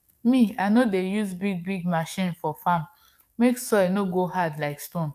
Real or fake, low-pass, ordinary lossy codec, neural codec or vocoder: fake; 14.4 kHz; none; codec, 44.1 kHz, 7.8 kbps, DAC